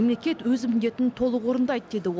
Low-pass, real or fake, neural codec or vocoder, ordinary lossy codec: none; real; none; none